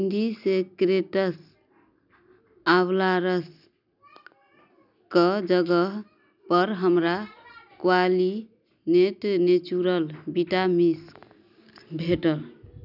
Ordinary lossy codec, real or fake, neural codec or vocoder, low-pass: none; real; none; 5.4 kHz